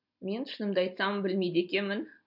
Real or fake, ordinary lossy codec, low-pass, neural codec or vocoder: real; none; 5.4 kHz; none